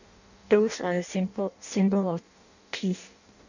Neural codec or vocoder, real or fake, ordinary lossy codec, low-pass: codec, 16 kHz in and 24 kHz out, 0.6 kbps, FireRedTTS-2 codec; fake; none; 7.2 kHz